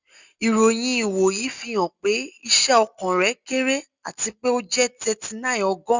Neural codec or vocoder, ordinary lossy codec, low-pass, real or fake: none; Opus, 64 kbps; 7.2 kHz; real